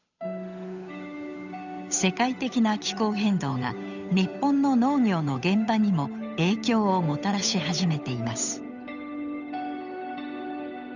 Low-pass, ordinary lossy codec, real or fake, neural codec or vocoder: 7.2 kHz; none; fake; codec, 16 kHz, 8 kbps, FunCodec, trained on Chinese and English, 25 frames a second